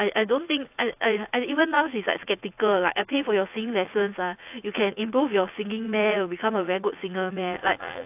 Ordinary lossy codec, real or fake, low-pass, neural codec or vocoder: AAC, 32 kbps; fake; 3.6 kHz; vocoder, 44.1 kHz, 80 mel bands, Vocos